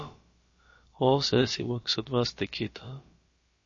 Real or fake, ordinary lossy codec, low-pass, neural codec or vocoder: fake; MP3, 32 kbps; 7.2 kHz; codec, 16 kHz, about 1 kbps, DyCAST, with the encoder's durations